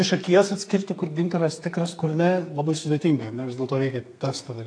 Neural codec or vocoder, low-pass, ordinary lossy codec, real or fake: codec, 32 kHz, 1.9 kbps, SNAC; 9.9 kHz; AAC, 48 kbps; fake